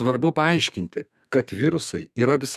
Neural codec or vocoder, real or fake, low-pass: codec, 32 kHz, 1.9 kbps, SNAC; fake; 14.4 kHz